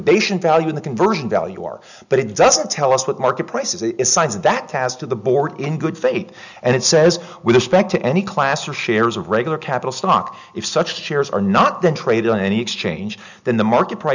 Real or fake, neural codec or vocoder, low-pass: real; none; 7.2 kHz